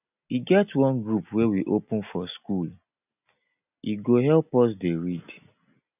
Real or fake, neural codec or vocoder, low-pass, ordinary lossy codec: real; none; 3.6 kHz; none